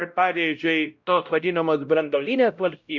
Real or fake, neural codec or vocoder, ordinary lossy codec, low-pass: fake; codec, 16 kHz, 0.5 kbps, X-Codec, WavLM features, trained on Multilingual LibriSpeech; Opus, 64 kbps; 7.2 kHz